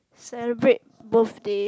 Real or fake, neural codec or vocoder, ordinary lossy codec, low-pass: real; none; none; none